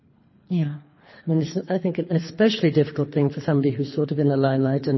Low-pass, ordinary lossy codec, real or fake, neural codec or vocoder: 7.2 kHz; MP3, 24 kbps; fake; codec, 24 kHz, 3 kbps, HILCodec